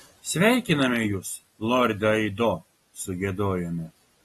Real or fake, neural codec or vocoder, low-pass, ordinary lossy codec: real; none; 19.8 kHz; AAC, 32 kbps